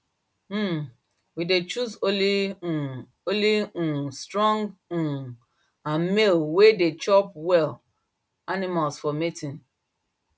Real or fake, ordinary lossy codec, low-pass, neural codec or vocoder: real; none; none; none